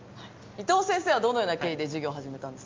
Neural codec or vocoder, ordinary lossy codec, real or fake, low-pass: none; Opus, 24 kbps; real; 7.2 kHz